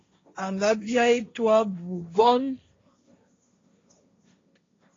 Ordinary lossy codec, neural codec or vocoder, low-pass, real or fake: AAC, 32 kbps; codec, 16 kHz, 1.1 kbps, Voila-Tokenizer; 7.2 kHz; fake